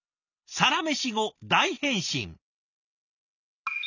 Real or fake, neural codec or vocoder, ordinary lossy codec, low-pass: real; none; none; 7.2 kHz